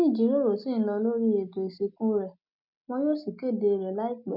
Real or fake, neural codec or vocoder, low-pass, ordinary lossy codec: real; none; 5.4 kHz; none